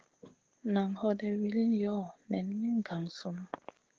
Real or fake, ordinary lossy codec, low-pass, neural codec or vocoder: fake; Opus, 16 kbps; 7.2 kHz; codec, 16 kHz, 6 kbps, DAC